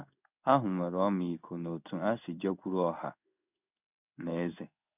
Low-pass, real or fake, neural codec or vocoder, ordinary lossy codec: 3.6 kHz; fake; codec, 16 kHz in and 24 kHz out, 1 kbps, XY-Tokenizer; none